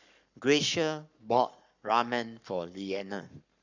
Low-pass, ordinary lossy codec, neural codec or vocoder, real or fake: 7.2 kHz; none; codec, 44.1 kHz, 7.8 kbps, Pupu-Codec; fake